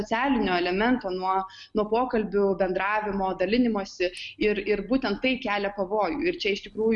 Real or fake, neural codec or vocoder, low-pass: real; none; 10.8 kHz